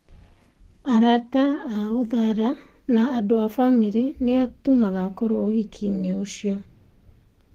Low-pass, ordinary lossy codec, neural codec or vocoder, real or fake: 14.4 kHz; Opus, 24 kbps; codec, 32 kHz, 1.9 kbps, SNAC; fake